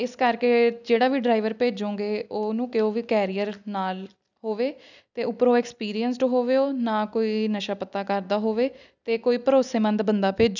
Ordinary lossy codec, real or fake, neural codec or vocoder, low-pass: none; real; none; 7.2 kHz